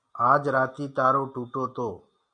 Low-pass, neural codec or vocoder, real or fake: 9.9 kHz; none; real